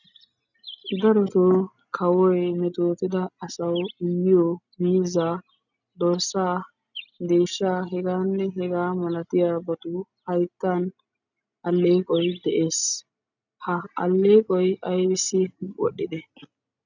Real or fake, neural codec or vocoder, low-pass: real; none; 7.2 kHz